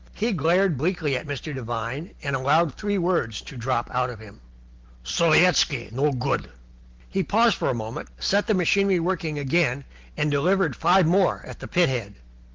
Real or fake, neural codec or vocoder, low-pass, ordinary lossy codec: real; none; 7.2 kHz; Opus, 16 kbps